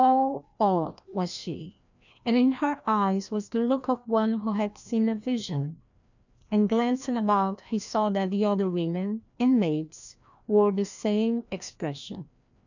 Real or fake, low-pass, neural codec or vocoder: fake; 7.2 kHz; codec, 16 kHz, 1 kbps, FreqCodec, larger model